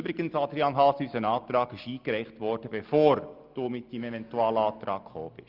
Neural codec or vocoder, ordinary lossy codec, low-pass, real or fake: none; Opus, 24 kbps; 5.4 kHz; real